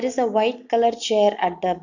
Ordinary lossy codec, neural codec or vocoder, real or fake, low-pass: none; none; real; 7.2 kHz